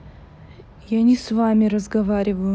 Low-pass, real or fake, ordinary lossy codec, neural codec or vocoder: none; real; none; none